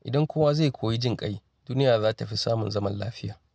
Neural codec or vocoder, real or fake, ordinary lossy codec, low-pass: none; real; none; none